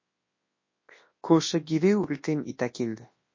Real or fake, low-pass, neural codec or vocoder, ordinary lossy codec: fake; 7.2 kHz; codec, 24 kHz, 0.9 kbps, WavTokenizer, large speech release; MP3, 32 kbps